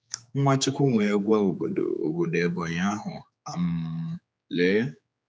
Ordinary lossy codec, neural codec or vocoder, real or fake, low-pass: none; codec, 16 kHz, 4 kbps, X-Codec, HuBERT features, trained on general audio; fake; none